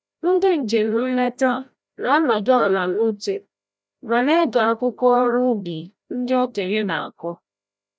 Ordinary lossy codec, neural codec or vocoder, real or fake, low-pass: none; codec, 16 kHz, 0.5 kbps, FreqCodec, larger model; fake; none